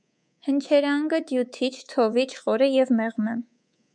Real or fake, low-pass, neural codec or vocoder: fake; 9.9 kHz; codec, 24 kHz, 3.1 kbps, DualCodec